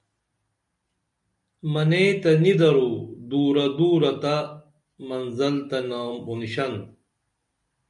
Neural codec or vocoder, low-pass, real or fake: none; 10.8 kHz; real